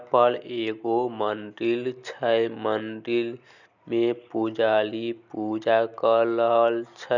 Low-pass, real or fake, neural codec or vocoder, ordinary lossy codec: 7.2 kHz; real; none; none